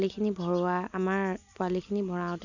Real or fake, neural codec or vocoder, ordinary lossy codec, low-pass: real; none; none; 7.2 kHz